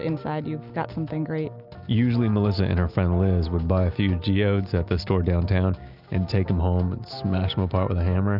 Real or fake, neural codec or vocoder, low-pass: real; none; 5.4 kHz